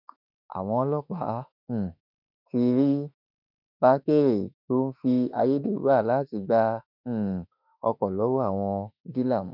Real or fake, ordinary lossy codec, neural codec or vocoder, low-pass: fake; none; autoencoder, 48 kHz, 32 numbers a frame, DAC-VAE, trained on Japanese speech; 5.4 kHz